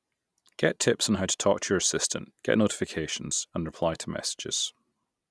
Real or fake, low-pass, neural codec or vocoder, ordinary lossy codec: real; none; none; none